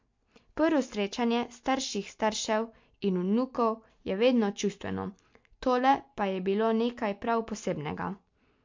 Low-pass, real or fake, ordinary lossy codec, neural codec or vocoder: 7.2 kHz; real; MP3, 48 kbps; none